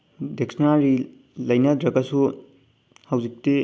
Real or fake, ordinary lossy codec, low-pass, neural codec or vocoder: real; none; none; none